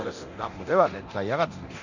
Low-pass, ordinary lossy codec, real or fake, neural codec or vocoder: 7.2 kHz; none; fake; codec, 24 kHz, 0.9 kbps, DualCodec